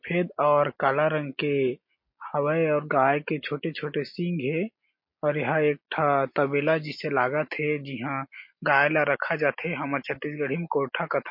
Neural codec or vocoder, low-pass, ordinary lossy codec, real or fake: none; 5.4 kHz; MP3, 32 kbps; real